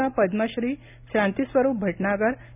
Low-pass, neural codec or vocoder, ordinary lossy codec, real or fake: 3.6 kHz; none; none; real